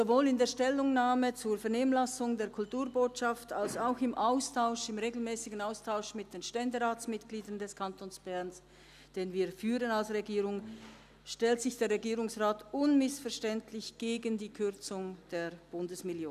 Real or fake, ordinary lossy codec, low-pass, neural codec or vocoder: real; none; 14.4 kHz; none